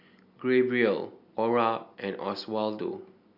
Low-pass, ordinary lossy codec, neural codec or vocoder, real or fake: 5.4 kHz; MP3, 48 kbps; none; real